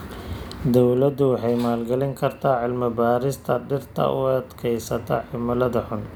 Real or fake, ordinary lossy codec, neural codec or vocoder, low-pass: fake; none; vocoder, 44.1 kHz, 128 mel bands every 256 samples, BigVGAN v2; none